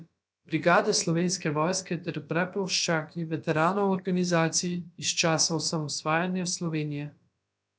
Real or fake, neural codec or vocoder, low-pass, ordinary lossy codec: fake; codec, 16 kHz, about 1 kbps, DyCAST, with the encoder's durations; none; none